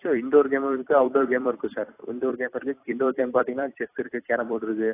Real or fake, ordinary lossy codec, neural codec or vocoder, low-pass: fake; AAC, 24 kbps; vocoder, 44.1 kHz, 128 mel bands every 256 samples, BigVGAN v2; 3.6 kHz